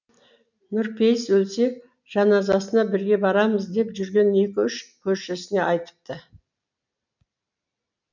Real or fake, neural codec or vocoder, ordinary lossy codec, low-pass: real; none; none; 7.2 kHz